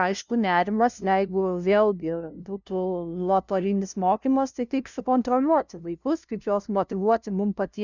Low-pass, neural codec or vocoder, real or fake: 7.2 kHz; codec, 16 kHz, 0.5 kbps, FunCodec, trained on LibriTTS, 25 frames a second; fake